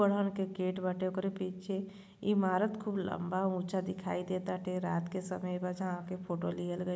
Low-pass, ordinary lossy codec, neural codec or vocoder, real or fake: none; none; none; real